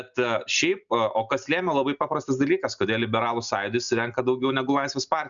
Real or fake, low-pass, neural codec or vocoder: real; 7.2 kHz; none